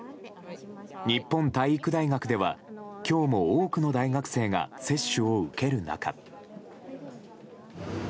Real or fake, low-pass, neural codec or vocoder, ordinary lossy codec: real; none; none; none